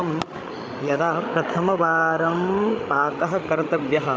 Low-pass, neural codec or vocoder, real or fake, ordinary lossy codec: none; codec, 16 kHz, 16 kbps, FreqCodec, larger model; fake; none